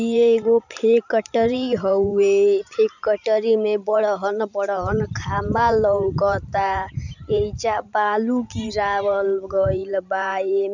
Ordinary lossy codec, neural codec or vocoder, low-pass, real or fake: none; vocoder, 44.1 kHz, 128 mel bands every 512 samples, BigVGAN v2; 7.2 kHz; fake